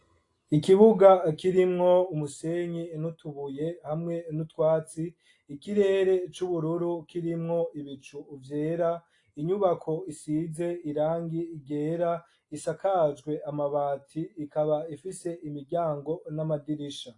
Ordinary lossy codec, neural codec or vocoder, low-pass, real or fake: AAC, 48 kbps; none; 10.8 kHz; real